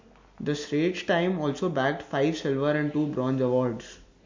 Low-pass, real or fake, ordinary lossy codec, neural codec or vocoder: 7.2 kHz; real; MP3, 48 kbps; none